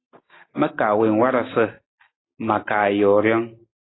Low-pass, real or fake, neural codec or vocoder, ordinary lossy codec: 7.2 kHz; real; none; AAC, 16 kbps